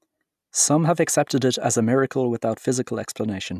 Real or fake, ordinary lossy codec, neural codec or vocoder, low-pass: real; none; none; 14.4 kHz